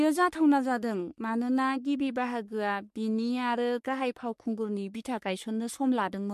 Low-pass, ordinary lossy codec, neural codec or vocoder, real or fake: 14.4 kHz; MP3, 64 kbps; codec, 44.1 kHz, 3.4 kbps, Pupu-Codec; fake